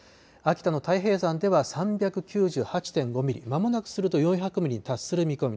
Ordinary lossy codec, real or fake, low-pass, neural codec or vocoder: none; real; none; none